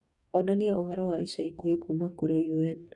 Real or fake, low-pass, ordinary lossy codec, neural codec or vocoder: fake; 10.8 kHz; none; codec, 44.1 kHz, 2.6 kbps, DAC